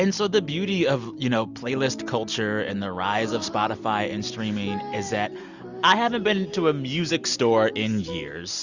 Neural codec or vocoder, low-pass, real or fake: none; 7.2 kHz; real